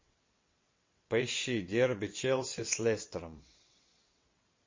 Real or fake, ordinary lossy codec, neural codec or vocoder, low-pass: fake; MP3, 32 kbps; vocoder, 22.05 kHz, 80 mel bands, WaveNeXt; 7.2 kHz